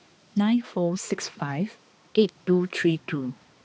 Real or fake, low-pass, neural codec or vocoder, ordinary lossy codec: fake; none; codec, 16 kHz, 2 kbps, X-Codec, HuBERT features, trained on balanced general audio; none